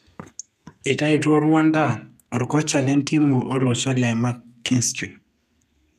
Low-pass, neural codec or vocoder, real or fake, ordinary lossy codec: 14.4 kHz; codec, 32 kHz, 1.9 kbps, SNAC; fake; none